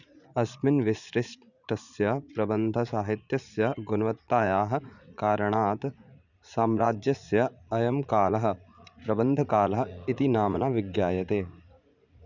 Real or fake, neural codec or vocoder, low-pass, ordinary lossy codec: fake; vocoder, 44.1 kHz, 80 mel bands, Vocos; 7.2 kHz; none